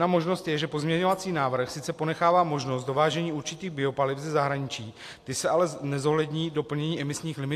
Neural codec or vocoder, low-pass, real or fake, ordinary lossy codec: none; 14.4 kHz; real; AAC, 64 kbps